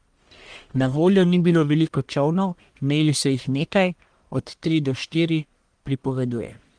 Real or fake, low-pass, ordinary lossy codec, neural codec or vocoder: fake; 9.9 kHz; Opus, 24 kbps; codec, 44.1 kHz, 1.7 kbps, Pupu-Codec